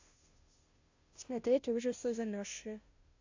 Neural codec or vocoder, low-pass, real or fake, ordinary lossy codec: codec, 16 kHz, 0.5 kbps, FunCodec, trained on Chinese and English, 25 frames a second; 7.2 kHz; fake; AAC, 48 kbps